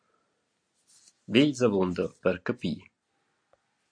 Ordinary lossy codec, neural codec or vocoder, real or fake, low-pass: MP3, 48 kbps; none; real; 9.9 kHz